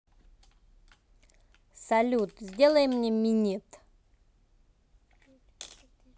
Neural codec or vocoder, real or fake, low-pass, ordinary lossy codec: none; real; none; none